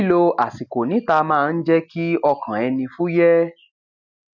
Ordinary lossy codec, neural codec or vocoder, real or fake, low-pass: none; none; real; 7.2 kHz